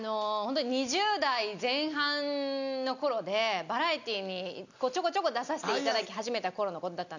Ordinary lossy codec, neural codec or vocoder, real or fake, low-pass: none; none; real; 7.2 kHz